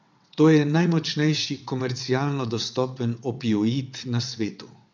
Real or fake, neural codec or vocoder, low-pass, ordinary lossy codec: fake; vocoder, 22.05 kHz, 80 mel bands, WaveNeXt; 7.2 kHz; none